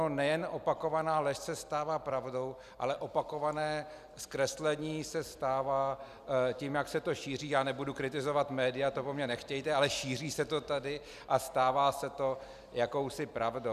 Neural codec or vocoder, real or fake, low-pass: none; real; 14.4 kHz